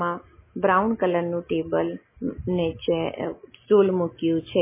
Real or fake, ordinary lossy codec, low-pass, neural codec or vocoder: real; MP3, 16 kbps; 3.6 kHz; none